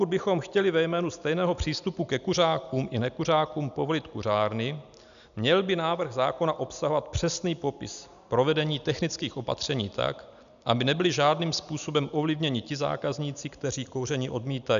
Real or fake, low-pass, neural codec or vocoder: real; 7.2 kHz; none